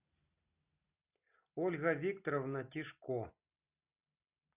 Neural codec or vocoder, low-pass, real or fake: none; 3.6 kHz; real